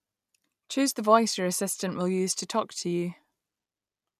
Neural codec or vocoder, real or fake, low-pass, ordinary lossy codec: none; real; 14.4 kHz; none